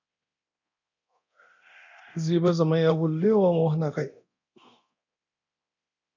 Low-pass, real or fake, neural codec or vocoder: 7.2 kHz; fake; codec, 24 kHz, 0.9 kbps, DualCodec